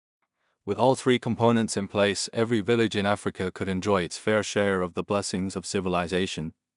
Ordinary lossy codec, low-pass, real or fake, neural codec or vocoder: none; 10.8 kHz; fake; codec, 16 kHz in and 24 kHz out, 0.4 kbps, LongCat-Audio-Codec, two codebook decoder